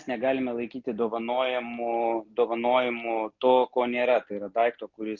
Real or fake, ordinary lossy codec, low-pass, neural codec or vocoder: real; MP3, 48 kbps; 7.2 kHz; none